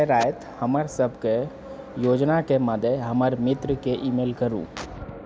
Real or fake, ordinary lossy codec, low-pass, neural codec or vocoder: real; none; none; none